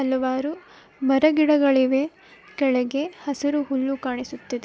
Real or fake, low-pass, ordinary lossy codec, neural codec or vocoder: real; none; none; none